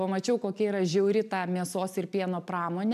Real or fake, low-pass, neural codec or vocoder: real; 14.4 kHz; none